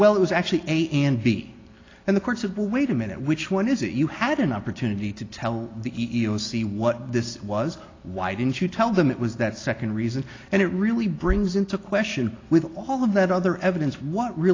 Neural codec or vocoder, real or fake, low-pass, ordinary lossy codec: none; real; 7.2 kHz; AAC, 32 kbps